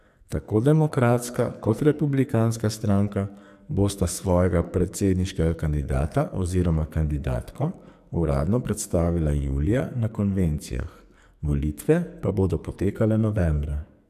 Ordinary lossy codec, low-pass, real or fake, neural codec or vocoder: none; 14.4 kHz; fake; codec, 44.1 kHz, 2.6 kbps, SNAC